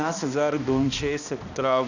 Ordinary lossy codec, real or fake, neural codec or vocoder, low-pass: none; fake; codec, 16 kHz, 1 kbps, X-Codec, HuBERT features, trained on balanced general audio; 7.2 kHz